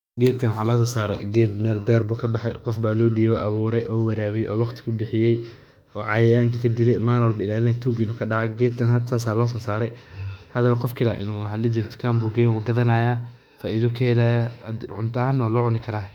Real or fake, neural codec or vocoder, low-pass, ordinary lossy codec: fake; autoencoder, 48 kHz, 32 numbers a frame, DAC-VAE, trained on Japanese speech; 19.8 kHz; none